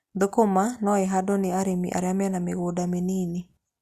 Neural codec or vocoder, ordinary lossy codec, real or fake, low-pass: none; Opus, 64 kbps; real; 14.4 kHz